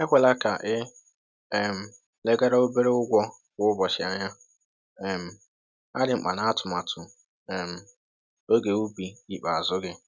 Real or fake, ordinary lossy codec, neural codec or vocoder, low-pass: real; none; none; none